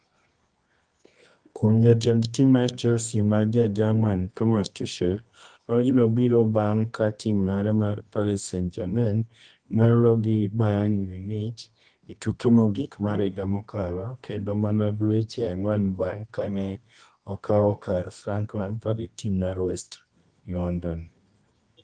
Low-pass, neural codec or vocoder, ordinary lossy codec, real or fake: 9.9 kHz; codec, 24 kHz, 0.9 kbps, WavTokenizer, medium music audio release; Opus, 32 kbps; fake